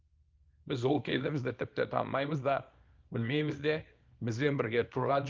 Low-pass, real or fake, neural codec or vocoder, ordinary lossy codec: 7.2 kHz; fake; codec, 24 kHz, 0.9 kbps, WavTokenizer, small release; Opus, 32 kbps